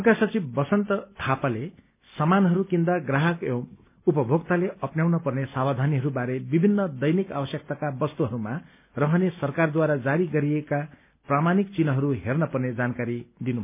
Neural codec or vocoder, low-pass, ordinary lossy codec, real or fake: none; 3.6 kHz; MP3, 32 kbps; real